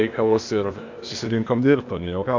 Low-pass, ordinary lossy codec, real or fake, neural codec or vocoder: 7.2 kHz; MP3, 64 kbps; fake; codec, 16 kHz, 0.8 kbps, ZipCodec